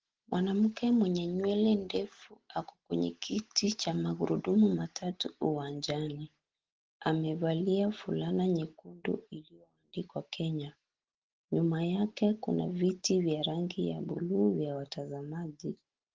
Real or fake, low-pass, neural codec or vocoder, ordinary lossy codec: real; 7.2 kHz; none; Opus, 16 kbps